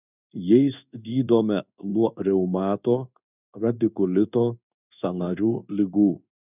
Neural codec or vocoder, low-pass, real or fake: codec, 16 kHz in and 24 kHz out, 1 kbps, XY-Tokenizer; 3.6 kHz; fake